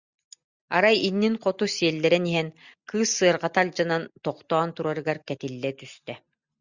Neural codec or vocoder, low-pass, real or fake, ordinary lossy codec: none; 7.2 kHz; real; Opus, 64 kbps